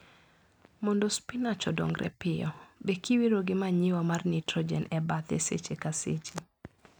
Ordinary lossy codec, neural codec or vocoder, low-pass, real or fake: none; none; 19.8 kHz; real